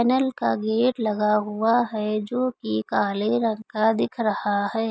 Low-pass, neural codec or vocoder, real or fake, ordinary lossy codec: none; none; real; none